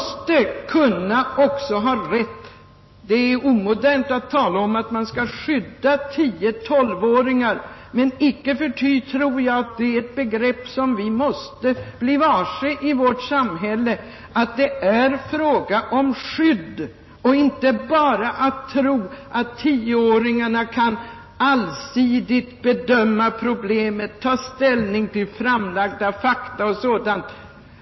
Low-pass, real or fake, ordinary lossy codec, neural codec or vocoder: 7.2 kHz; real; MP3, 24 kbps; none